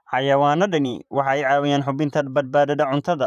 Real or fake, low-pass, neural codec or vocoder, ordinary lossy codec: fake; 14.4 kHz; vocoder, 44.1 kHz, 128 mel bands, Pupu-Vocoder; none